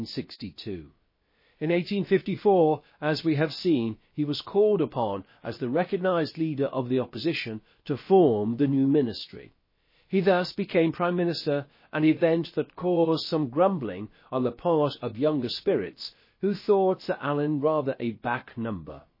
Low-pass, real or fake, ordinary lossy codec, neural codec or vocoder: 5.4 kHz; fake; MP3, 24 kbps; codec, 16 kHz, about 1 kbps, DyCAST, with the encoder's durations